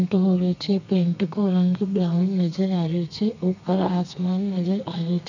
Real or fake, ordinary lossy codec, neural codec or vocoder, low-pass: fake; none; codec, 32 kHz, 1.9 kbps, SNAC; 7.2 kHz